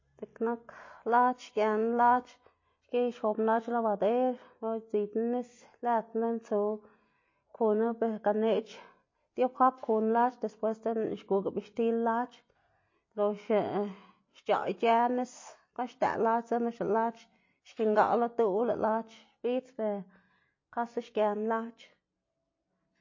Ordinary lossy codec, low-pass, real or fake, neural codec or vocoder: MP3, 32 kbps; 7.2 kHz; real; none